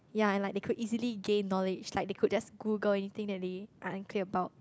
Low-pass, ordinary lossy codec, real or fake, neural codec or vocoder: none; none; real; none